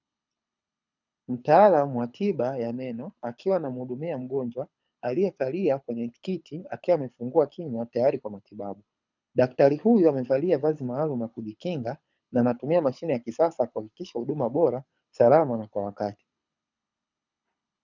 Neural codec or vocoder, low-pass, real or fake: codec, 24 kHz, 6 kbps, HILCodec; 7.2 kHz; fake